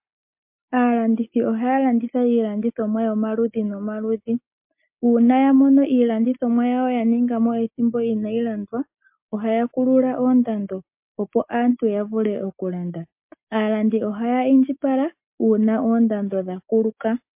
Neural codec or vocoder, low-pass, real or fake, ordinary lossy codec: none; 3.6 kHz; real; MP3, 32 kbps